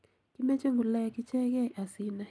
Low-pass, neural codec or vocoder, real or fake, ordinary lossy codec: none; none; real; none